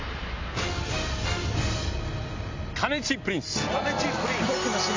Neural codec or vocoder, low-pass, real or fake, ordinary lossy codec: none; 7.2 kHz; real; MP3, 64 kbps